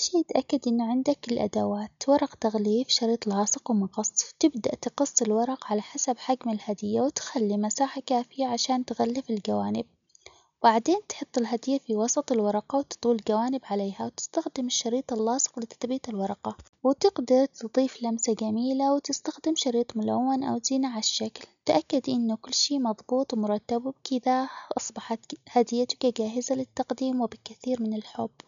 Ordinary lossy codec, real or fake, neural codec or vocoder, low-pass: none; real; none; 7.2 kHz